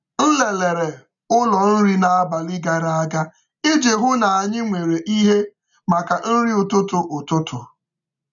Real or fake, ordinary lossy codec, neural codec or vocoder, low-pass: real; none; none; 7.2 kHz